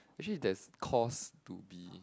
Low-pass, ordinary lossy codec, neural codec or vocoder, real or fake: none; none; none; real